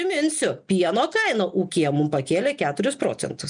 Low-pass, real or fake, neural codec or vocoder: 9.9 kHz; real; none